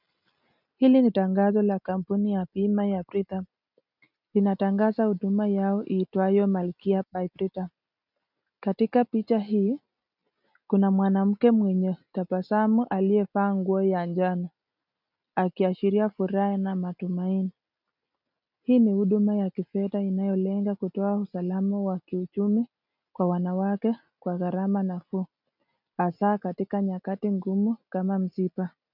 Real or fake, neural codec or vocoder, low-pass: real; none; 5.4 kHz